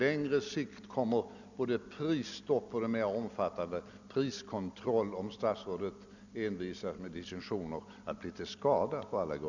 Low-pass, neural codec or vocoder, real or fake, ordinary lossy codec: 7.2 kHz; none; real; none